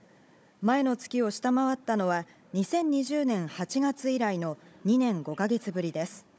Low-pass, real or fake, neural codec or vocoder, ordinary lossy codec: none; fake; codec, 16 kHz, 16 kbps, FunCodec, trained on Chinese and English, 50 frames a second; none